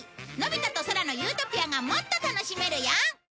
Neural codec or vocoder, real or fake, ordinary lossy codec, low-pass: none; real; none; none